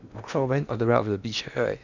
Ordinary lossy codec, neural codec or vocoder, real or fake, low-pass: none; codec, 16 kHz in and 24 kHz out, 0.8 kbps, FocalCodec, streaming, 65536 codes; fake; 7.2 kHz